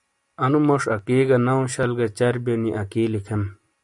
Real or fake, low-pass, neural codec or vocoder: real; 10.8 kHz; none